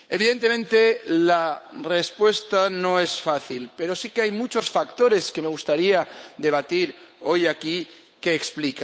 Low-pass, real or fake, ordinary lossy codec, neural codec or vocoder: none; fake; none; codec, 16 kHz, 8 kbps, FunCodec, trained on Chinese and English, 25 frames a second